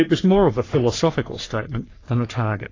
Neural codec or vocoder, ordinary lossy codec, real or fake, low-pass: codec, 44.1 kHz, 3.4 kbps, Pupu-Codec; AAC, 32 kbps; fake; 7.2 kHz